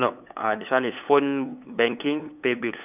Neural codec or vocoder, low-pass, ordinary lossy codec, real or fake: codec, 16 kHz, 8 kbps, FunCodec, trained on LibriTTS, 25 frames a second; 3.6 kHz; none; fake